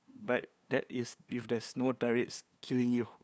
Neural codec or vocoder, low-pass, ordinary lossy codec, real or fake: codec, 16 kHz, 2 kbps, FunCodec, trained on LibriTTS, 25 frames a second; none; none; fake